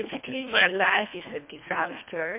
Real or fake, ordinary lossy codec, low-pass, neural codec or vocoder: fake; MP3, 32 kbps; 3.6 kHz; codec, 24 kHz, 1.5 kbps, HILCodec